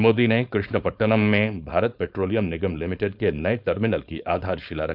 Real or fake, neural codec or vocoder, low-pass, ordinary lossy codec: fake; codec, 16 kHz, 4.8 kbps, FACodec; 5.4 kHz; none